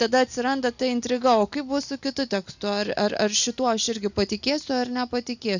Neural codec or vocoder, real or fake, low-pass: none; real; 7.2 kHz